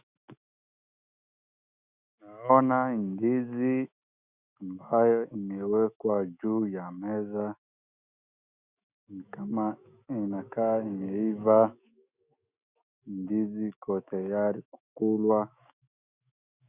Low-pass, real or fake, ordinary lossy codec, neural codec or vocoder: 3.6 kHz; fake; Opus, 64 kbps; autoencoder, 48 kHz, 128 numbers a frame, DAC-VAE, trained on Japanese speech